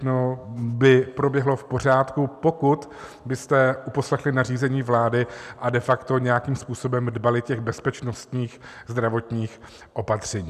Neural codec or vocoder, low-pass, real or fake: none; 14.4 kHz; real